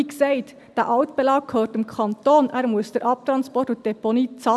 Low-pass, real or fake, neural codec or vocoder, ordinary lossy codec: none; real; none; none